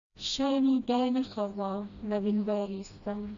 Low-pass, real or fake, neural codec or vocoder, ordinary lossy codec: 7.2 kHz; fake; codec, 16 kHz, 1 kbps, FreqCodec, smaller model; Opus, 64 kbps